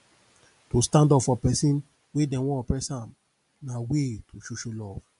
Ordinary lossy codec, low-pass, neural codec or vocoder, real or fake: MP3, 64 kbps; 10.8 kHz; none; real